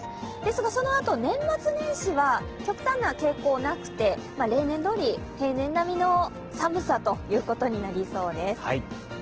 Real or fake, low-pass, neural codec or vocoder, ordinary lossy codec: real; 7.2 kHz; none; Opus, 16 kbps